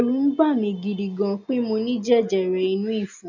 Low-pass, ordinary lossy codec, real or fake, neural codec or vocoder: 7.2 kHz; none; real; none